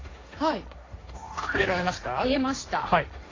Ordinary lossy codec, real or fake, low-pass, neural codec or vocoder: AAC, 32 kbps; fake; 7.2 kHz; codec, 16 kHz, 1.1 kbps, Voila-Tokenizer